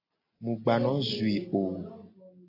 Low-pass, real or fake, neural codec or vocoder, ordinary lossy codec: 5.4 kHz; real; none; AAC, 24 kbps